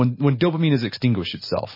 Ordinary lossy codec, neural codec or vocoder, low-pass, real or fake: MP3, 24 kbps; none; 5.4 kHz; real